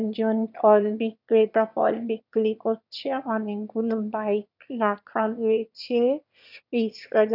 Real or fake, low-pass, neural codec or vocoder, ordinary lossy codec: fake; 5.4 kHz; autoencoder, 22.05 kHz, a latent of 192 numbers a frame, VITS, trained on one speaker; none